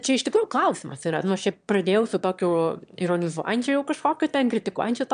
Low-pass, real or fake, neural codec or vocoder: 9.9 kHz; fake; autoencoder, 22.05 kHz, a latent of 192 numbers a frame, VITS, trained on one speaker